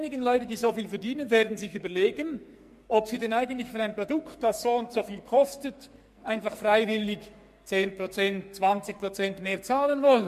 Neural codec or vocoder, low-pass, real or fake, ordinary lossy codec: codec, 44.1 kHz, 2.6 kbps, SNAC; 14.4 kHz; fake; MP3, 64 kbps